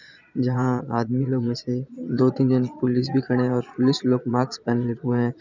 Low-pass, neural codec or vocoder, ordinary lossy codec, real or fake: 7.2 kHz; vocoder, 22.05 kHz, 80 mel bands, Vocos; none; fake